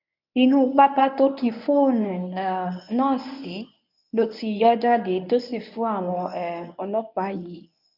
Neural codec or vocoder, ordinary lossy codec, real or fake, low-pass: codec, 24 kHz, 0.9 kbps, WavTokenizer, medium speech release version 1; none; fake; 5.4 kHz